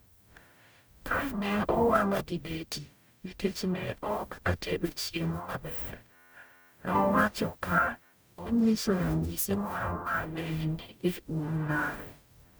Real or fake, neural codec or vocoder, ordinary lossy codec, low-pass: fake; codec, 44.1 kHz, 0.9 kbps, DAC; none; none